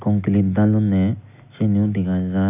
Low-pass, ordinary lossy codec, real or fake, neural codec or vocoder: 3.6 kHz; none; real; none